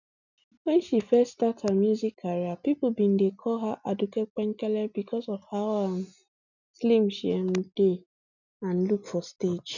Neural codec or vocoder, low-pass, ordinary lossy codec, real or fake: none; 7.2 kHz; none; real